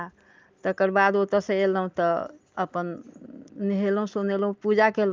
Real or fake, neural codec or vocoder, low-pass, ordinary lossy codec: real; none; 7.2 kHz; Opus, 24 kbps